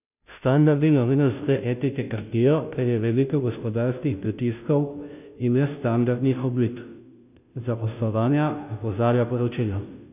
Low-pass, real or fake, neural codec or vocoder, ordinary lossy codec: 3.6 kHz; fake; codec, 16 kHz, 0.5 kbps, FunCodec, trained on Chinese and English, 25 frames a second; none